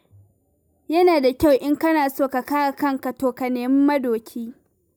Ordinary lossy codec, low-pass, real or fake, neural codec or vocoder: none; none; real; none